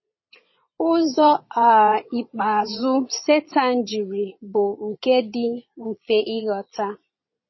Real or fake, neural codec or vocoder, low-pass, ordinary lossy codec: fake; vocoder, 22.05 kHz, 80 mel bands, Vocos; 7.2 kHz; MP3, 24 kbps